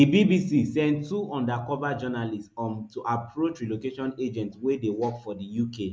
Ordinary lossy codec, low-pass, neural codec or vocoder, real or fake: none; none; none; real